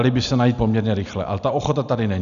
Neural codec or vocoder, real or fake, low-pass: none; real; 7.2 kHz